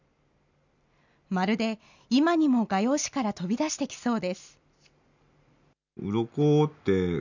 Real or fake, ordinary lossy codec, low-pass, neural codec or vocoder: real; none; 7.2 kHz; none